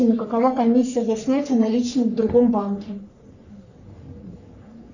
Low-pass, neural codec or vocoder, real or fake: 7.2 kHz; codec, 44.1 kHz, 3.4 kbps, Pupu-Codec; fake